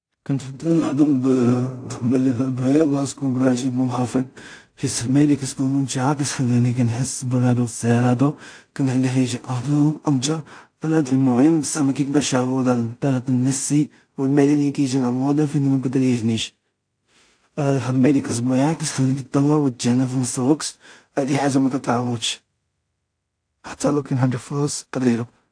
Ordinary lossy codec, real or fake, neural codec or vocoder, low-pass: AAC, 64 kbps; fake; codec, 16 kHz in and 24 kHz out, 0.4 kbps, LongCat-Audio-Codec, two codebook decoder; 9.9 kHz